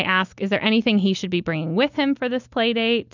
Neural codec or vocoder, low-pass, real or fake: none; 7.2 kHz; real